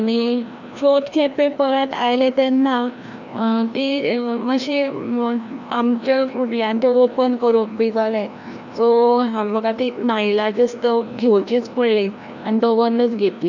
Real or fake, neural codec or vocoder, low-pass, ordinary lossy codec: fake; codec, 16 kHz, 1 kbps, FreqCodec, larger model; 7.2 kHz; none